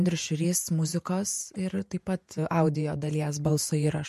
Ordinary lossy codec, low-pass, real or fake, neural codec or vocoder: MP3, 64 kbps; 14.4 kHz; fake; vocoder, 48 kHz, 128 mel bands, Vocos